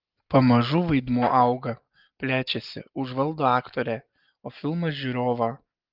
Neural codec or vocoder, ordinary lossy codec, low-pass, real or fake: none; Opus, 24 kbps; 5.4 kHz; real